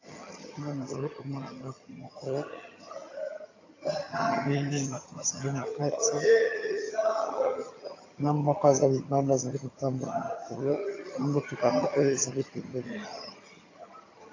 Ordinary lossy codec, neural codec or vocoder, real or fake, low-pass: AAC, 32 kbps; vocoder, 22.05 kHz, 80 mel bands, HiFi-GAN; fake; 7.2 kHz